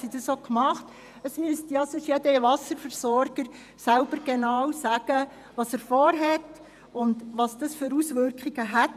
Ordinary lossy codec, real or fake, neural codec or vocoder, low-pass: none; fake; vocoder, 48 kHz, 128 mel bands, Vocos; 14.4 kHz